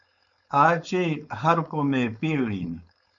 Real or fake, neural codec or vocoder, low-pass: fake; codec, 16 kHz, 4.8 kbps, FACodec; 7.2 kHz